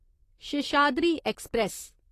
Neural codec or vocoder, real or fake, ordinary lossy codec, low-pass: vocoder, 44.1 kHz, 128 mel bands, Pupu-Vocoder; fake; AAC, 48 kbps; 14.4 kHz